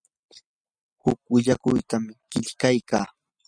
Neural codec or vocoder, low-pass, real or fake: none; 9.9 kHz; real